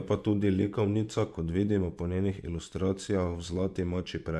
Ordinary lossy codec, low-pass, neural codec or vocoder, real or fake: none; none; vocoder, 24 kHz, 100 mel bands, Vocos; fake